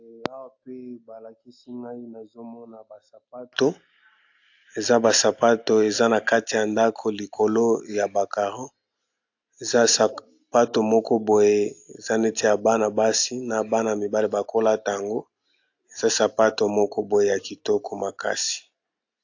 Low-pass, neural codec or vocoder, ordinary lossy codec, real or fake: 7.2 kHz; none; AAC, 48 kbps; real